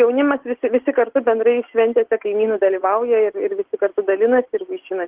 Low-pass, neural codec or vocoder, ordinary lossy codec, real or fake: 3.6 kHz; none; Opus, 16 kbps; real